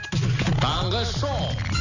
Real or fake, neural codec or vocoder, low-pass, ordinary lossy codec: real; none; 7.2 kHz; none